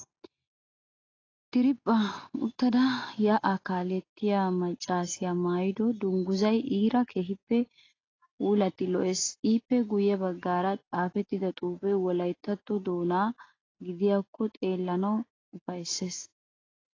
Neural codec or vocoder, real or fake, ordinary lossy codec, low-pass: none; real; AAC, 32 kbps; 7.2 kHz